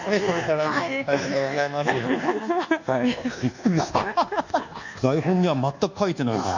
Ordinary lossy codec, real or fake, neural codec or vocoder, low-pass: none; fake; codec, 24 kHz, 1.2 kbps, DualCodec; 7.2 kHz